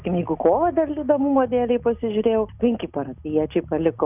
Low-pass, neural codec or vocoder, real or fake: 3.6 kHz; none; real